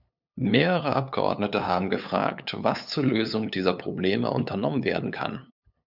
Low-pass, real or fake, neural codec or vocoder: 5.4 kHz; fake; codec, 16 kHz, 8 kbps, FunCodec, trained on LibriTTS, 25 frames a second